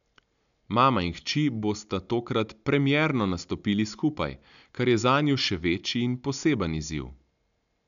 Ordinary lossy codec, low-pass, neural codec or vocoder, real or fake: none; 7.2 kHz; none; real